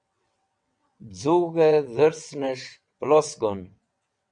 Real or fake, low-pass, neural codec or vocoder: fake; 9.9 kHz; vocoder, 22.05 kHz, 80 mel bands, WaveNeXt